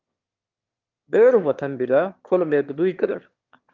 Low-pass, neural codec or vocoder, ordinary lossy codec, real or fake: 7.2 kHz; autoencoder, 22.05 kHz, a latent of 192 numbers a frame, VITS, trained on one speaker; Opus, 32 kbps; fake